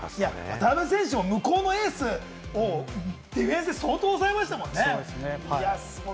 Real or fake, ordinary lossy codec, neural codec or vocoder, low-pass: real; none; none; none